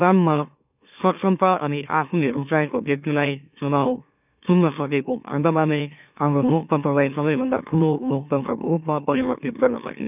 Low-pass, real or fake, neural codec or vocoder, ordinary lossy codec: 3.6 kHz; fake; autoencoder, 44.1 kHz, a latent of 192 numbers a frame, MeloTTS; none